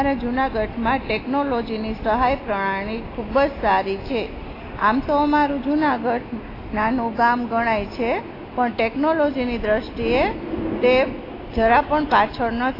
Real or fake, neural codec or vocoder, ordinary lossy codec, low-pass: real; none; AAC, 24 kbps; 5.4 kHz